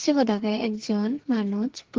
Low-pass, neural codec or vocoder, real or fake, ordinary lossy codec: 7.2 kHz; codec, 44.1 kHz, 2.6 kbps, SNAC; fake; Opus, 16 kbps